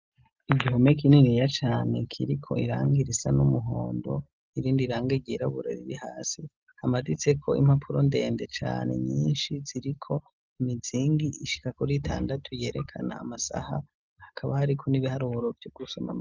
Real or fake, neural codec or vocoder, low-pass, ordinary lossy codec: real; none; 7.2 kHz; Opus, 32 kbps